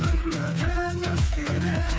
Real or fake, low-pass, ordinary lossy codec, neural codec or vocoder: fake; none; none; codec, 16 kHz, 4 kbps, FreqCodec, smaller model